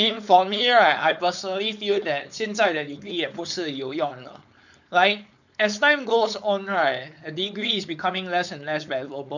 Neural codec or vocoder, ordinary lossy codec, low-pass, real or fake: codec, 16 kHz, 4.8 kbps, FACodec; none; 7.2 kHz; fake